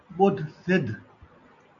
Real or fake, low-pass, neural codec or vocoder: real; 7.2 kHz; none